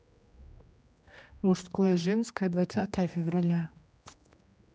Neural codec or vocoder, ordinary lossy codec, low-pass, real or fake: codec, 16 kHz, 1 kbps, X-Codec, HuBERT features, trained on general audio; none; none; fake